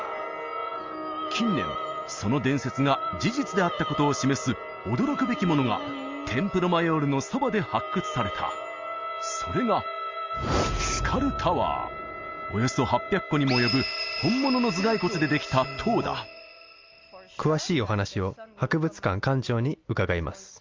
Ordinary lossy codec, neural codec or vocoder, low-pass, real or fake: Opus, 32 kbps; none; 7.2 kHz; real